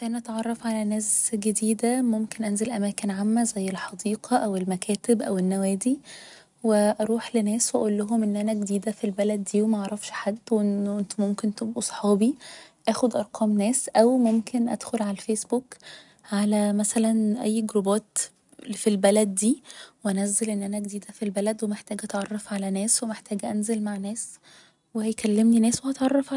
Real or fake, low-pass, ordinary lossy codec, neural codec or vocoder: real; 10.8 kHz; none; none